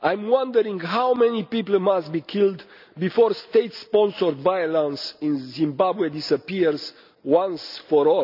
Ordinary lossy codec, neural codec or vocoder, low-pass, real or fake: none; none; 5.4 kHz; real